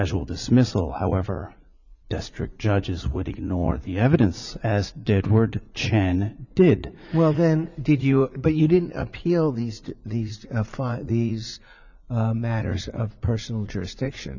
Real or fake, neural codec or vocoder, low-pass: fake; vocoder, 44.1 kHz, 80 mel bands, Vocos; 7.2 kHz